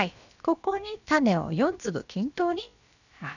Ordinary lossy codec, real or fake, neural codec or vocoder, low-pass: none; fake; codec, 16 kHz, about 1 kbps, DyCAST, with the encoder's durations; 7.2 kHz